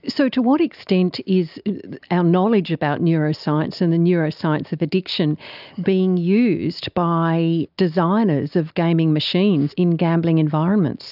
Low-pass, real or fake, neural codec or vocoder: 5.4 kHz; real; none